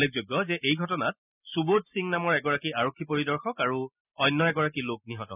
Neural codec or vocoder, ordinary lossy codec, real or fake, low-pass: none; none; real; 3.6 kHz